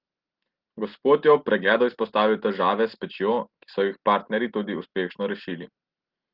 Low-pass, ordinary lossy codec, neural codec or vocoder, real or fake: 5.4 kHz; Opus, 16 kbps; none; real